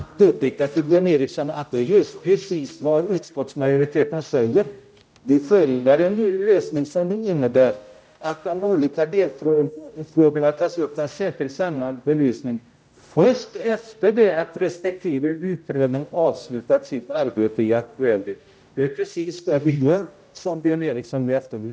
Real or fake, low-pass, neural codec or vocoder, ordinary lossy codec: fake; none; codec, 16 kHz, 0.5 kbps, X-Codec, HuBERT features, trained on general audio; none